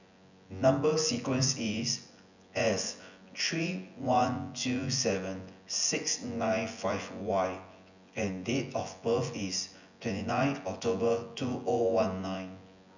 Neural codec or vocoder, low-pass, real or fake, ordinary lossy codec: vocoder, 24 kHz, 100 mel bands, Vocos; 7.2 kHz; fake; none